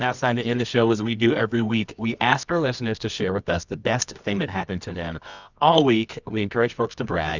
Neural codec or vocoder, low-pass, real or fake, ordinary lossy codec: codec, 24 kHz, 0.9 kbps, WavTokenizer, medium music audio release; 7.2 kHz; fake; Opus, 64 kbps